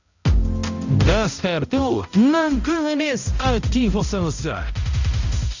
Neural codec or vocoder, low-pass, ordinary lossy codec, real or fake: codec, 16 kHz, 0.5 kbps, X-Codec, HuBERT features, trained on balanced general audio; 7.2 kHz; none; fake